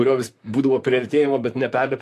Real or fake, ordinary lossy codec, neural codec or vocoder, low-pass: fake; AAC, 64 kbps; vocoder, 44.1 kHz, 128 mel bands, Pupu-Vocoder; 14.4 kHz